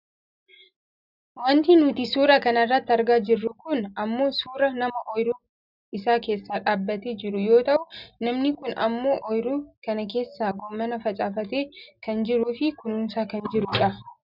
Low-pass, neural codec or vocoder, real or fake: 5.4 kHz; none; real